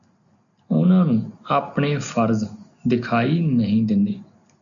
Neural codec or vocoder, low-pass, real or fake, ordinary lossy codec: none; 7.2 kHz; real; MP3, 96 kbps